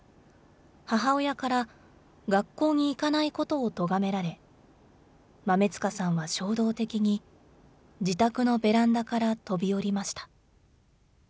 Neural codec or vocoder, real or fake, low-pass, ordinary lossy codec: none; real; none; none